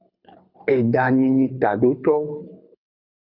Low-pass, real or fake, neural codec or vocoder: 5.4 kHz; fake; codec, 24 kHz, 6 kbps, HILCodec